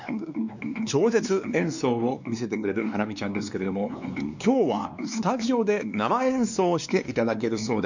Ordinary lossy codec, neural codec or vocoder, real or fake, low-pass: none; codec, 16 kHz, 2 kbps, X-Codec, WavLM features, trained on Multilingual LibriSpeech; fake; 7.2 kHz